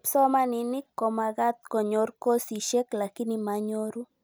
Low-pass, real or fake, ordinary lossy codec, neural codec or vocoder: none; real; none; none